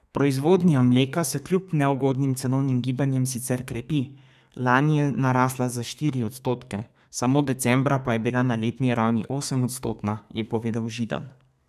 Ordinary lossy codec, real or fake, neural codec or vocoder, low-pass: none; fake; codec, 32 kHz, 1.9 kbps, SNAC; 14.4 kHz